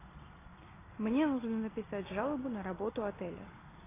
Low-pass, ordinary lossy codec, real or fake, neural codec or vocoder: 3.6 kHz; AAC, 16 kbps; real; none